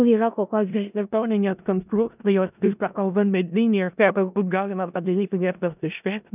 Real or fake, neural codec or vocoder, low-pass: fake; codec, 16 kHz in and 24 kHz out, 0.4 kbps, LongCat-Audio-Codec, four codebook decoder; 3.6 kHz